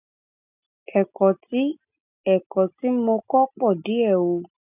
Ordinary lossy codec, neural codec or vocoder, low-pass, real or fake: none; none; 3.6 kHz; real